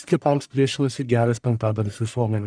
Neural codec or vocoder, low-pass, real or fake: codec, 44.1 kHz, 1.7 kbps, Pupu-Codec; 9.9 kHz; fake